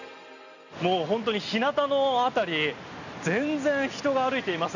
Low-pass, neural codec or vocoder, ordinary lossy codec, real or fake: 7.2 kHz; none; none; real